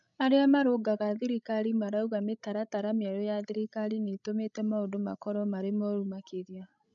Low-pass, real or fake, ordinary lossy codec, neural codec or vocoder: 7.2 kHz; fake; none; codec, 16 kHz, 16 kbps, FreqCodec, larger model